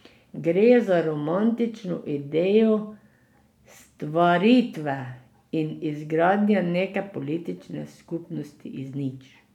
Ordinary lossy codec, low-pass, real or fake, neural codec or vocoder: none; 19.8 kHz; real; none